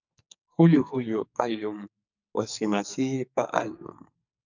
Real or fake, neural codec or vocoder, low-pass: fake; codec, 44.1 kHz, 2.6 kbps, SNAC; 7.2 kHz